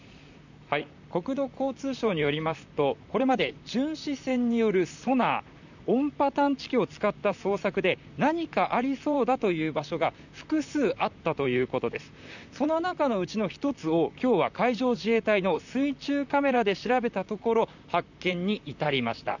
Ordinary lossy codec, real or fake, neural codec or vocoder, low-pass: none; fake; vocoder, 44.1 kHz, 128 mel bands, Pupu-Vocoder; 7.2 kHz